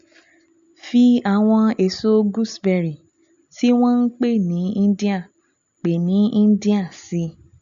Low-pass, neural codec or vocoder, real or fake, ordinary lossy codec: 7.2 kHz; none; real; MP3, 64 kbps